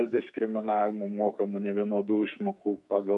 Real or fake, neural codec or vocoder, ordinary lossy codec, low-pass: fake; codec, 44.1 kHz, 2.6 kbps, SNAC; MP3, 96 kbps; 10.8 kHz